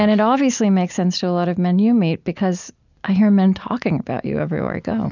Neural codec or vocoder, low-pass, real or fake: none; 7.2 kHz; real